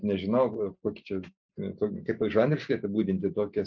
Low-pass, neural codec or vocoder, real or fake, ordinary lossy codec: 7.2 kHz; none; real; MP3, 64 kbps